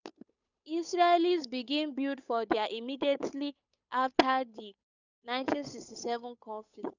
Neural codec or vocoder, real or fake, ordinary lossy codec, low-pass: codec, 16 kHz, 8 kbps, FunCodec, trained on Chinese and English, 25 frames a second; fake; none; 7.2 kHz